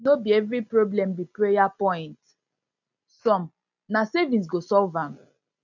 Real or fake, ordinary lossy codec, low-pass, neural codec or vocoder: real; none; 7.2 kHz; none